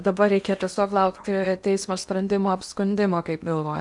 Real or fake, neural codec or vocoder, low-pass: fake; codec, 16 kHz in and 24 kHz out, 0.8 kbps, FocalCodec, streaming, 65536 codes; 10.8 kHz